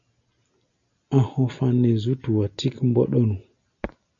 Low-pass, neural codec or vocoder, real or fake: 7.2 kHz; none; real